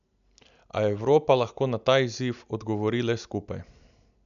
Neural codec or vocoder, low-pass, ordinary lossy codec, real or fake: none; 7.2 kHz; none; real